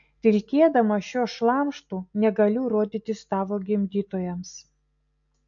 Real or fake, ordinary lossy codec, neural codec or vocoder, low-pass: real; AAC, 64 kbps; none; 7.2 kHz